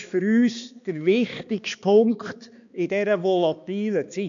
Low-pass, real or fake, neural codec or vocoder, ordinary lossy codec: 7.2 kHz; fake; codec, 16 kHz, 2 kbps, X-Codec, HuBERT features, trained on balanced general audio; MP3, 64 kbps